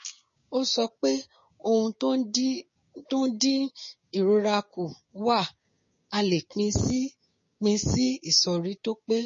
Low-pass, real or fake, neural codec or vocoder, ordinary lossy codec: 7.2 kHz; fake; codec, 16 kHz, 6 kbps, DAC; MP3, 32 kbps